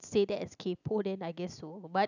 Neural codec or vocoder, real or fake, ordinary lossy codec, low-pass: none; real; none; 7.2 kHz